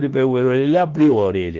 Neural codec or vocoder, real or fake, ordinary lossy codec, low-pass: autoencoder, 48 kHz, 32 numbers a frame, DAC-VAE, trained on Japanese speech; fake; Opus, 16 kbps; 7.2 kHz